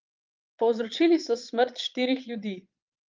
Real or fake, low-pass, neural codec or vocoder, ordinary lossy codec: fake; 7.2 kHz; vocoder, 22.05 kHz, 80 mel bands, Vocos; Opus, 32 kbps